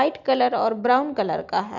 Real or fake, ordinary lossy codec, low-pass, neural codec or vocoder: real; none; 7.2 kHz; none